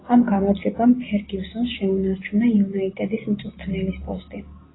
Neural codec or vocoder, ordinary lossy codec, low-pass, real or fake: none; AAC, 16 kbps; 7.2 kHz; real